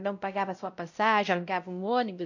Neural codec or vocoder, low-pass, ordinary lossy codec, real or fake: codec, 16 kHz, 0.5 kbps, X-Codec, WavLM features, trained on Multilingual LibriSpeech; 7.2 kHz; none; fake